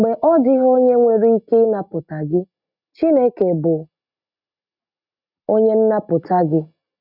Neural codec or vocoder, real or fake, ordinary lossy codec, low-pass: none; real; none; 5.4 kHz